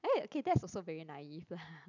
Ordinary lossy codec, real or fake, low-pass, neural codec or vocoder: none; real; 7.2 kHz; none